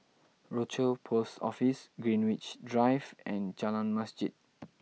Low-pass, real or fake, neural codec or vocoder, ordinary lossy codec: none; real; none; none